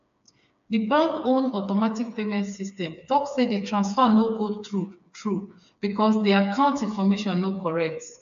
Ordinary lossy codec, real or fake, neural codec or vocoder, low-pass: AAC, 96 kbps; fake; codec, 16 kHz, 4 kbps, FreqCodec, smaller model; 7.2 kHz